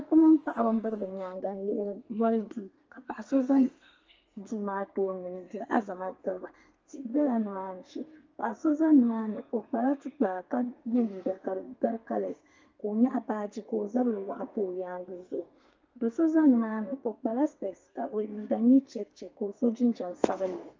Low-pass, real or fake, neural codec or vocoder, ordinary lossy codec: 7.2 kHz; fake; codec, 32 kHz, 1.9 kbps, SNAC; Opus, 24 kbps